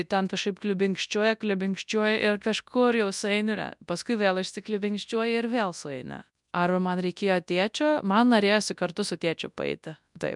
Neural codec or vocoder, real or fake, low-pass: codec, 24 kHz, 0.9 kbps, WavTokenizer, large speech release; fake; 10.8 kHz